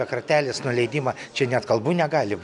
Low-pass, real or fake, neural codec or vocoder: 10.8 kHz; real; none